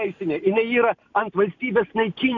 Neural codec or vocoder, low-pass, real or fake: none; 7.2 kHz; real